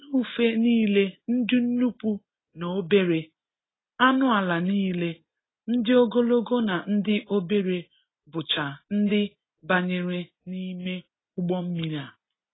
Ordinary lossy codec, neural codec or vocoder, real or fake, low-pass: AAC, 16 kbps; none; real; 7.2 kHz